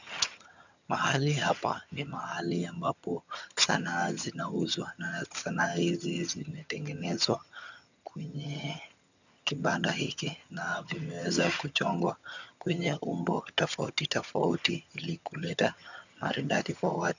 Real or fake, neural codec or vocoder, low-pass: fake; vocoder, 22.05 kHz, 80 mel bands, HiFi-GAN; 7.2 kHz